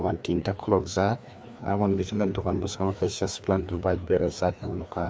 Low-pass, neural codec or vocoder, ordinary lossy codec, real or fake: none; codec, 16 kHz, 2 kbps, FreqCodec, larger model; none; fake